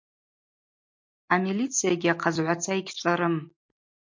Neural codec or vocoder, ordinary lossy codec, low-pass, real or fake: none; MP3, 48 kbps; 7.2 kHz; real